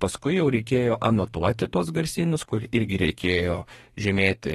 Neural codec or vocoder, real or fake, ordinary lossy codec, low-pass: codec, 32 kHz, 1.9 kbps, SNAC; fake; AAC, 32 kbps; 14.4 kHz